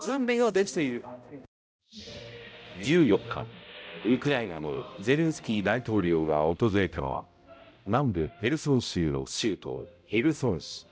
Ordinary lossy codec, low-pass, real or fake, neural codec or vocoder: none; none; fake; codec, 16 kHz, 0.5 kbps, X-Codec, HuBERT features, trained on balanced general audio